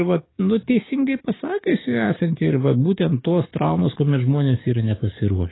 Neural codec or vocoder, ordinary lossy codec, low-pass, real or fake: codec, 44.1 kHz, 7.8 kbps, DAC; AAC, 16 kbps; 7.2 kHz; fake